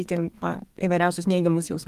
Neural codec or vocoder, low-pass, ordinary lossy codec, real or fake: codec, 32 kHz, 1.9 kbps, SNAC; 14.4 kHz; Opus, 24 kbps; fake